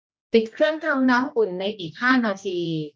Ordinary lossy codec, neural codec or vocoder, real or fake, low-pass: none; codec, 16 kHz, 1 kbps, X-Codec, HuBERT features, trained on general audio; fake; none